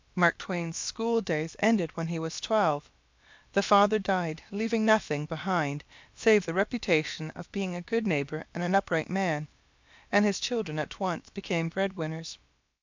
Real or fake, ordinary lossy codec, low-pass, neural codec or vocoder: fake; MP3, 64 kbps; 7.2 kHz; codec, 16 kHz, about 1 kbps, DyCAST, with the encoder's durations